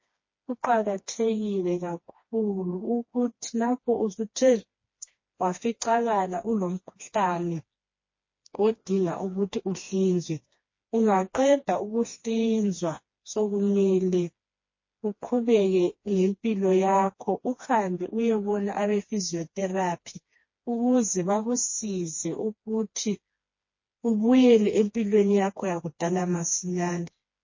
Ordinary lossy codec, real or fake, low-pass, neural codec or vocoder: MP3, 32 kbps; fake; 7.2 kHz; codec, 16 kHz, 2 kbps, FreqCodec, smaller model